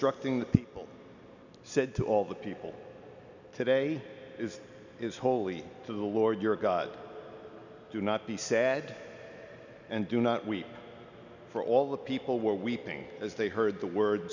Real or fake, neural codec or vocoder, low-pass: real; none; 7.2 kHz